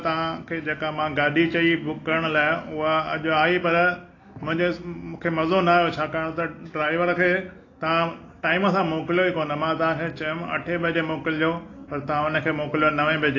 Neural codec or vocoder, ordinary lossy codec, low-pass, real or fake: none; AAC, 32 kbps; 7.2 kHz; real